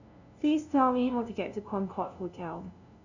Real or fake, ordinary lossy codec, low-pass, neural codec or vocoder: fake; none; 7.2 kHz; codec, 16 kHz, 0.5 kbps, FunCodec, trained on LibriTTS, 25 frames a second